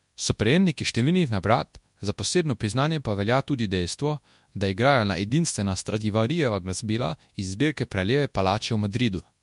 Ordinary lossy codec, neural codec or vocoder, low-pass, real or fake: MP3, 64 kbps; codec, 24 kHz, 0.9 kbps, WavTokenizer, large speech release; 10.8 kHz; fake